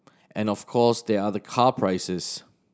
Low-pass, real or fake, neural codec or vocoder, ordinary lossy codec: none; real; none; none